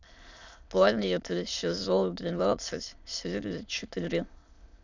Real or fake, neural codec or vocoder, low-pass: fake; autoencoder, 22.05 kHz, a latent of 192 numbers a frame, VITS, trained on many speakers; 7.2 kHz